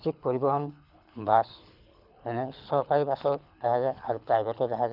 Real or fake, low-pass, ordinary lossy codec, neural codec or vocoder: fake; 5.4 kHz; none; codec, 24 kHz, 6 kbps, HILCodec